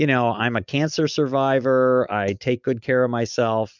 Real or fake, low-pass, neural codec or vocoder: real; 7.2 kHz; none